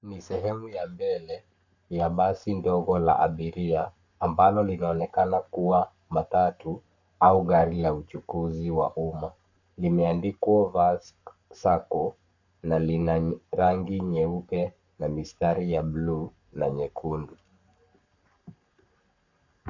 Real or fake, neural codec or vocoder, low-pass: fake; codec, 44.1 kHz, 7.8 kbps, Pupu-Codec; 7.2 kHz